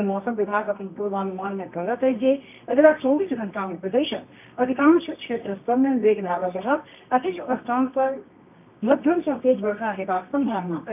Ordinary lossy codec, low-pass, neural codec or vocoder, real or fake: none; 3.6 kHz; codec, 24 kHz, 0.9 kbps, WavTokenizer, medium music audio release; fake